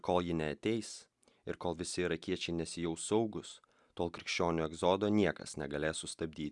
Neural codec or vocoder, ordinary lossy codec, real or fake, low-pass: none; Opus, 64 kbps; real; 10.8 kHz